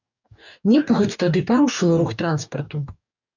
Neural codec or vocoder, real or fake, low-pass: codec, 44.1 kHz, 2.6 kbps, DAC; fake; 7.2 kHz